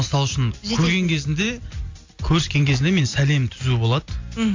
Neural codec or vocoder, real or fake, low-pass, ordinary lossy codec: none; real; 7.2 kHz; AAC, 48 kbps